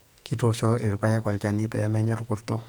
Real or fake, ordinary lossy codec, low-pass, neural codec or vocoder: fake; none; none; codec, 44.1 kHz, 2.6 kbps, SNAC